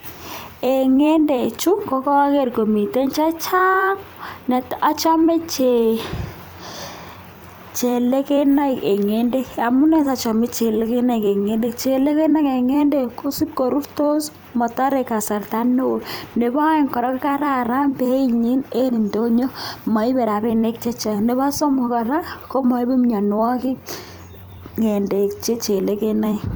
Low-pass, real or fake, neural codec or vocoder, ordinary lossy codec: none; fake; vocoder, 44.1 kHz, 128 mel bands every 256 samples, BigVGAN v2; none